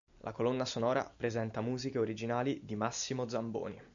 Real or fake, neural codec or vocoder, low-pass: real; none; 7.2 kHz